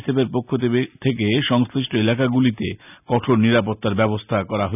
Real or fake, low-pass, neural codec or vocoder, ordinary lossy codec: real; 3.6 kHz; none; none